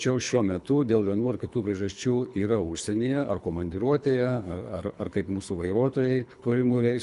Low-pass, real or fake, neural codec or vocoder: 10.8 kHz; fake; codec, 24 kHz, 3 kbps, HILCodec